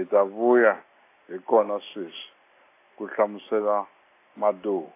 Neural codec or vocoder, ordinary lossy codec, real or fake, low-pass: none; AAC, 24 kbps; real; 3.6 kHz